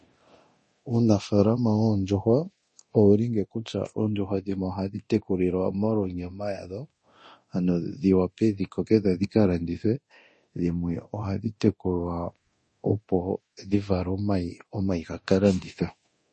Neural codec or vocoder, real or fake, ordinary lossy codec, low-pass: codec, 24 kHz, 0.9 kbps, DualCodec; fake; MP3, 32 kbps; 10.8 kHz